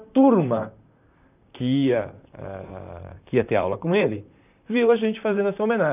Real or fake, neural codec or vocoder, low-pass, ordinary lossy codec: fake; vocoder, 44.1 kHz, 128 mel bands, Pupu-Vocoder; 3.6 kHz; none